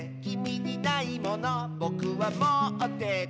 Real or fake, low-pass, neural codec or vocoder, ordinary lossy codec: real; none; none; none